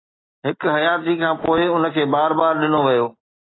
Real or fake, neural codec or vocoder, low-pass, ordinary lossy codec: real; none; 7.2 kHz; AAC, 16 kbps